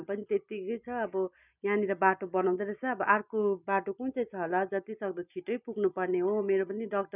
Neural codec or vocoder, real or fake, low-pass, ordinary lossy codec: none; real; 3.6 kHz; none